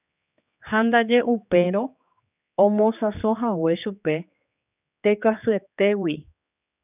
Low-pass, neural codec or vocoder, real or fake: 3.6 kHz; codec, 16 kHz, 4 kbps, X-Codec, HuBERT features, trained on general audio; fake